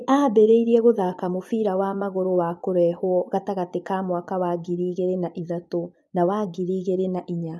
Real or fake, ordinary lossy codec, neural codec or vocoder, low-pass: fake; none; vocoder, 24 kHz, 100 mel bands, Vocos; none